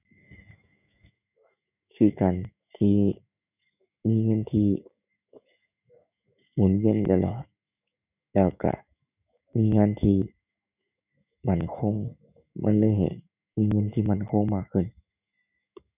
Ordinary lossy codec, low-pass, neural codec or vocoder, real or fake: none; 3.6 kHz; autoencoder, 48 kHz, 128 numbers a frame, DAC-VAE, trained on Japanese speech; fake